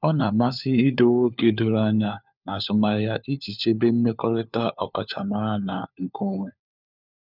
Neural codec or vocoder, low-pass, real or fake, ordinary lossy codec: codec, 16 kHz, 4 kbps, FunCodec, trained on LibriTTS, 50 frames a second; 5.4 kHz; fake; none